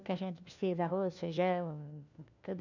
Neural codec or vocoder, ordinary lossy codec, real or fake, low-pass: codec, 16 kHz, 1 kbps, FunCodec, trained on LibriTTS, 50 frames a second; none; fake; 7.2 kHz